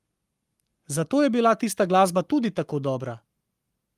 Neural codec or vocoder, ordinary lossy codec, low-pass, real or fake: codec, 44.1 kHz, 7.8 kbps, Pupu-Codec; Opus, 32 kbps; 14.4 kHz; fake